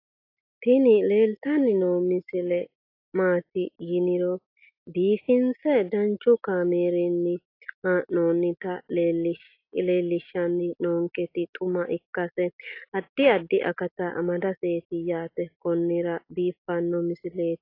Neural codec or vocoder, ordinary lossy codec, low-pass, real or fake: none; AAC, 32 kbps; 5.4 kHz; real